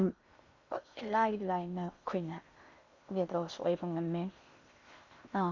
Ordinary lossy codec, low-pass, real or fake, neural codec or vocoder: Opus, 64 kbps; 7.2 kHz; fake; codec, 16 kHz in and 24 kHz out, 0.6 kbps, FocalCodec, streaming, 2048 codes